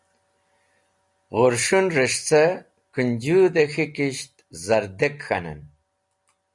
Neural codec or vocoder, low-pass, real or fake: none; 10.8 kHz; real